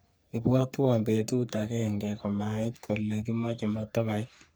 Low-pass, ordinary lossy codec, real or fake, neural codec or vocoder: none; none; fake; codec, 44.1 kHz, 3.4 kbps, Pupu-Codec